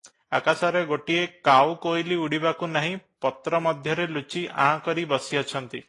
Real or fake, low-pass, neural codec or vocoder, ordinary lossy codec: real; 10.8 kHz; none; AAC, 32 kbps